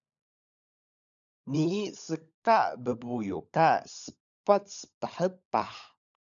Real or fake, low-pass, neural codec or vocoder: fake; 7.2 kHz; codec, 16 kHz, 16 kbps, FunCodec, trained on LibriTTS, 50 frames a second